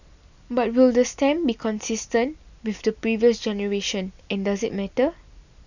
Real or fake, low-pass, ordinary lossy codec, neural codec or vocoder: real; 7.2 kHz; none; none